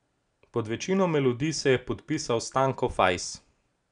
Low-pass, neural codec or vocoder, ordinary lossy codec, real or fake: 9.9 kHz; none; none; real